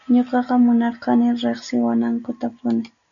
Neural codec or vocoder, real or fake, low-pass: none; real; 7.2 kHz